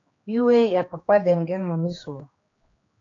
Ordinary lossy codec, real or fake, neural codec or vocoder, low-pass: AAC, 32 kbps; fake; codec, 16 kHz, 2 kbps, X-Codec, HuBERT features, trained on general audio; 7.2 kHz